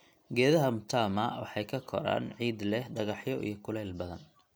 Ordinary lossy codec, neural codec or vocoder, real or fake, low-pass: none; none; real; none